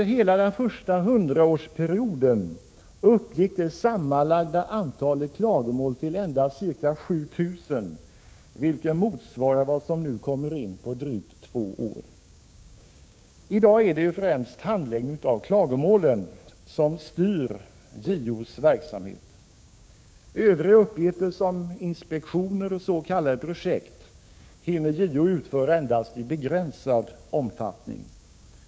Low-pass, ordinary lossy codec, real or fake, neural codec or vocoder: none; none; fake; codec, 16 kHz, 6 kbps, DAC